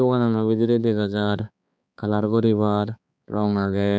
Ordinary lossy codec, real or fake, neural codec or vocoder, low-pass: none; fake; codec, 16 kHz, 4 kbps, X-Codec, HuBERT features, trained on balanced general audio; none